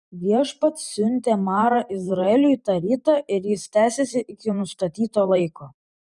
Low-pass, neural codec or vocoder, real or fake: 10.8 kHz; vocoder, 44.1 kHz, 128 mel bands every 512 samples, BigVGAN v2; fake